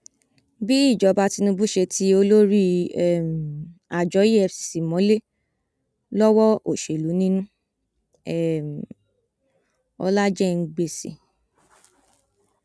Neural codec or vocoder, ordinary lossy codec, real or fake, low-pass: none; none; real; none